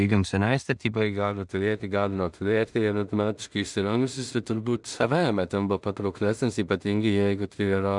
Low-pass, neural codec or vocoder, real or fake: 10.8 kHz; codec, 16 kHz in and 24 kHz out, 0.4 kbps, LongCat-Audio-Codec, two codebook decoder; fake